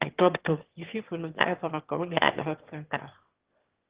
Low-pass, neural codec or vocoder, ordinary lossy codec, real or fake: 3.6 kHz; autoencoder, 22.05 kHz, a latent of 192 numbers a frame, VITS, trained on one speaker; Opus, 16 kbps; fake